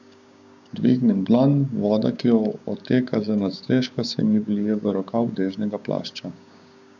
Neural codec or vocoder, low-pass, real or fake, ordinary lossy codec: codec, 16 kHz, 6 kbps, DAC; 7.2 kHz; fake; none